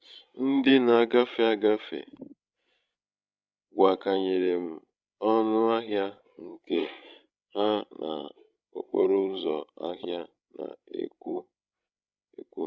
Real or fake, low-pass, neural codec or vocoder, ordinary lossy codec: fake; none; codec, 16 kHz, 16 kbps, FreqCodec, larger model; none